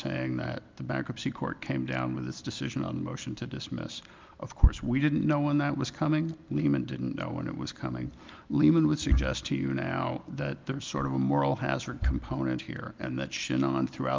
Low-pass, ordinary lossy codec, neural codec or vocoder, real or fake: 7.2 kHz; Opus, 24 kbps; none; real